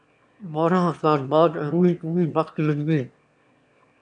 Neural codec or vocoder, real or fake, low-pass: autoencoder, 22.05 kHz, a latent of 192 numbers a frame, VITS, trained on one speaker; fake; 9.9 kHz